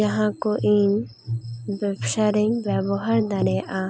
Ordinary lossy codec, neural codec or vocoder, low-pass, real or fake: none; none; none; real